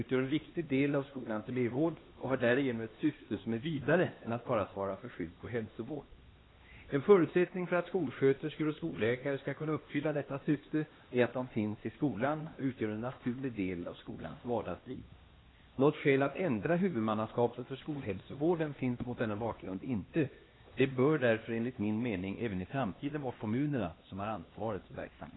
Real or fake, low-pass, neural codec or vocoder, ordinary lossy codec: fake; 7.2 kHz; codec, 16 kHz, 2 kbps, X-Codec, HuBERT features, trained on LibriSpeech; AAC, 16 kbps